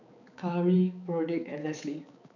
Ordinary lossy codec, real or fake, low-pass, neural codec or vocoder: none; fake; 7.2 kHz; codec, 16 kHz, 4 kbps, X-Codec, HuBERT features, trained on balanced general audio